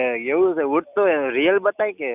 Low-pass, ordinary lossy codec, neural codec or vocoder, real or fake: 3.6 kHz; none; none; real